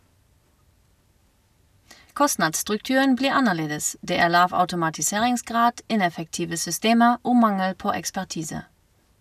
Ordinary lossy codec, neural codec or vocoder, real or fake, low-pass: none; none; real; 14.4 kHz